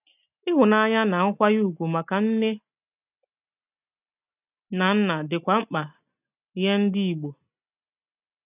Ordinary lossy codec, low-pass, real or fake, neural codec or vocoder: none; 3.6 kHz; real; none